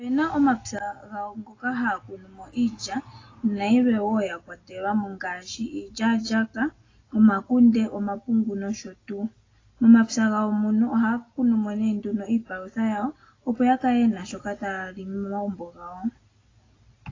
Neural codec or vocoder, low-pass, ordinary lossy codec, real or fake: none; 7.2 kHz; AAC, 32 kbps; real